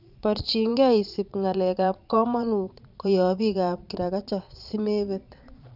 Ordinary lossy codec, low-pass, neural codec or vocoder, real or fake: none; 5.4 kHz; vocoder, 22.05 kHz, 80 mel bands, Vocos; fake